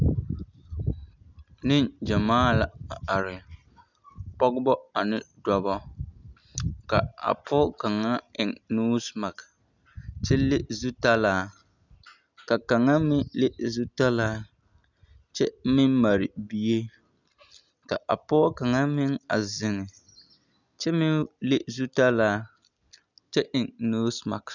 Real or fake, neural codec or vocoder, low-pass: real; none; 7.2 kHz